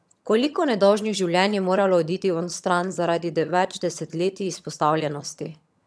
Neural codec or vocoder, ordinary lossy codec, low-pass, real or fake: vocoder, 22.05 kHz, 80 mel bands, HiFi-GAN; none; none; fake